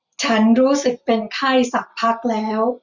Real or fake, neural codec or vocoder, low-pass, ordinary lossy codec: real; none; 7.2 kHz; Opus, 64 kbps